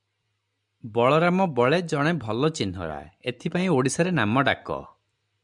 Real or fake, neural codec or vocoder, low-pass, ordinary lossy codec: real; none; 10.8 kHz; MP3, 96 kbps